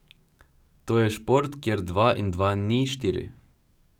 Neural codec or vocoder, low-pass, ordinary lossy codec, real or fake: codec, 44.1 kHz, 7.8 kbps, DAC; 19.8 kHz; none; fake